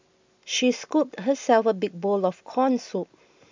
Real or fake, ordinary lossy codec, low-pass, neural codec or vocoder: real; MP3, 64 kbps; 7.2 kHz; none